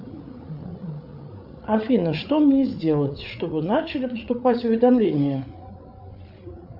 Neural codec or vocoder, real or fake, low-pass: codec, 16 kHz, 8 kbps, FreqCodec, larger model; fake; 5.4 kHz